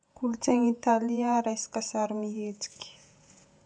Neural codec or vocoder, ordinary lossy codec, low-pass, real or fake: vocoder, 48 kHz, 128 mel bands, Vocos; none; 9.9 kHz; fake